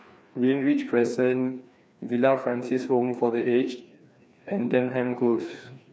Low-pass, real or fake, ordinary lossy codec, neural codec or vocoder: none; fake; none; codec, 16 kHz, 2 kbps, FreqCodec, larger model